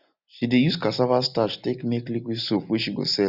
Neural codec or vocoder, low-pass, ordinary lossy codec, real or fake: none; 5.4 kHz; none; real